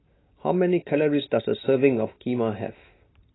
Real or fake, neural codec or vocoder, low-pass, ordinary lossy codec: real; none; 7.2 kHz; AAC, 16 kbps